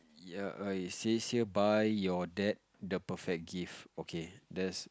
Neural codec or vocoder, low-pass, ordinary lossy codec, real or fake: none; none; none; real